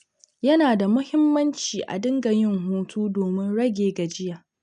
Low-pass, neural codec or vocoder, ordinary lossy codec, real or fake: 9.9 kHz; none; none; real